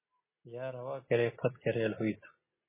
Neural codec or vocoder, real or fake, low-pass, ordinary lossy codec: none; real; 3.6 kHz; MP3, 16 kbps